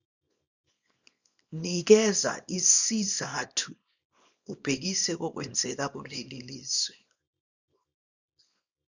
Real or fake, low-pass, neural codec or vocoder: fake; 7.2 kHz; codec, 24 kHz, 0.9 kbps, WavTokenizer, small release